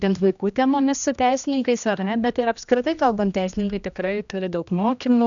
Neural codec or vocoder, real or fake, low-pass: codec, 16 kHz, 1 kbps, X-Codec, HuBERT features, trained on general audio; fake; 7.2 kHz